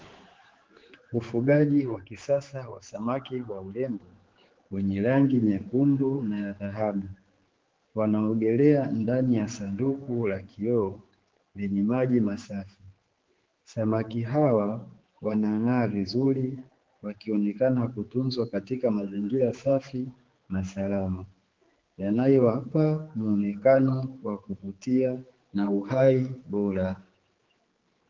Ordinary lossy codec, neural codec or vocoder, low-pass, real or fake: Opus, 16 kbps; codec, 16 kHz, 4 kbps, X-Codec, HuBERT features, trained on general audio; 7.2 kHz; fake